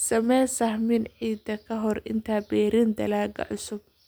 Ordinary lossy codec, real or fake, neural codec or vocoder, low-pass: none; real; none; none